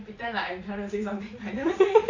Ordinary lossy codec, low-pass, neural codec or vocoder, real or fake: AAC, 48 kbps; 7.2 kHz; vocoder, 44.1 kHz, 80 mel bands, Vocos; fake